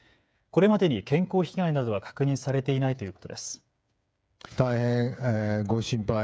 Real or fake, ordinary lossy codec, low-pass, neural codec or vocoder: fake; none; none; codec, 16 kHz, 8 kbps, FreqCodec, smaller model